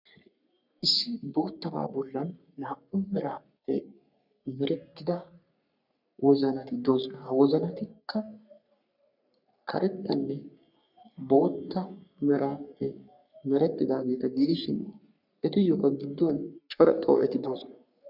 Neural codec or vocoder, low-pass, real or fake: codec, 44.1 kHz, 3.4 kbps, Pupu-Codec; 5.4 kHz; fake